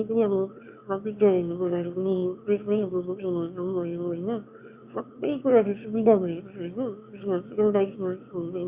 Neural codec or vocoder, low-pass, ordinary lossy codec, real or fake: autoencoder, 22.05 kHz, a latent of 192 numbers a frame, VITS, trained on one speaker; 3.6 kHz; Opus, 64 kbps; fake